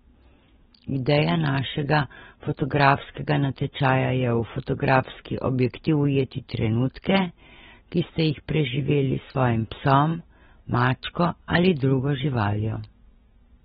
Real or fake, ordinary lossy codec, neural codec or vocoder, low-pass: real; AAC, 16 kbps; none; 19.8 kHz